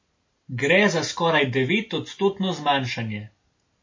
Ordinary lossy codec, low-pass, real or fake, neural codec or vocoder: MP3, 32 kbps; 7.2 kHz; real; none